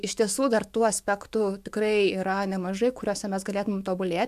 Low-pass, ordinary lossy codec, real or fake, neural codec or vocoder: 14.4 kHz; AAC, 96 kbps; fake; autoencoder, 48 kHz, 128 numbers a frame, DAC-VAE, trained on Japanese speech